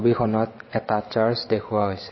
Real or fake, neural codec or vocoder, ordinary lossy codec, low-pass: fake; vocoder, 22.05 kHz, 80 mel bands, WaveNeXt; MP3, 24 kbps; 7.2 kHz